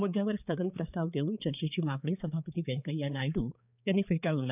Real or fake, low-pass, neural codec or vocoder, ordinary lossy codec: fake; 3.6 kHz; codec, 16 kHz, 4 kbps, X-Codec, HuBERT features, trained on balanced general audio; none